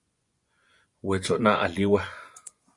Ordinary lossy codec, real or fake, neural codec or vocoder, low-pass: MP3, 64 kbps; fake; vocoder, 24 kHz, 100 mel bands, Vocos; 10.8 kHz